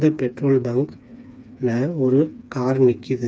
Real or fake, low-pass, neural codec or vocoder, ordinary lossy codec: fake; none; codec, 16 kHz, 4 kbps, FreqCodec, smaller model; none